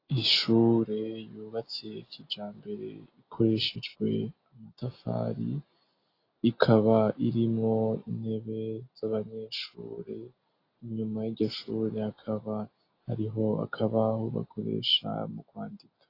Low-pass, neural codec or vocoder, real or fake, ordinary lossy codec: 5.4 kHz; none; real; AAC, 32 kbps